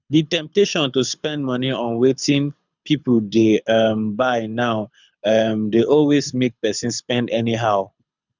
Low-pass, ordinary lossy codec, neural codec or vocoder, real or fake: 7.2 kHz; none; codec, 24 kHz, 6 kbps, HILCodec; fake